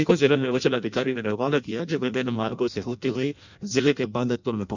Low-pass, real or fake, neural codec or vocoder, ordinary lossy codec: 7.2 kHz; fake; codec, 16 kHz in and 24 kHz out, 0.6 kbps, FireRedTTS-2 codec; none